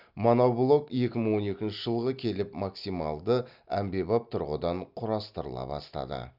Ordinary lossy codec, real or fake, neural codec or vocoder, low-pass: none; real; none; 5.4 kHz